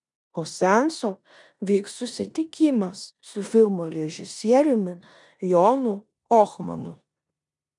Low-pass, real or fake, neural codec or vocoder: 10.8 kHz; fake; codec, 16 kHz in and 24 kHz out, 0.9 kbps, LongCat-Audio-Codec, four codebook decoder